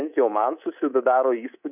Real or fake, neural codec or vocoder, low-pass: fake; codec, 24 kHz, 3.1 kbps, DualCodec; 3.6 kHz